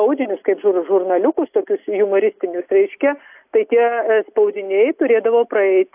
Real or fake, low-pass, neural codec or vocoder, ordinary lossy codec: real; 3.6 kHz; none; AAC, 32 kbps